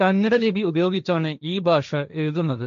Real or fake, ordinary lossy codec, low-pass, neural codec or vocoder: fake; MP3, 64 kbps; 7.2 kHz; codec, 16 kHz, 1.1 kbps, Voila-Tokenizer